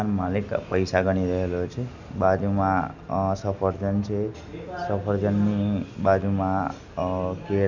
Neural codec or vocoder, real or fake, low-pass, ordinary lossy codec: none; real; 7.2 kHz; none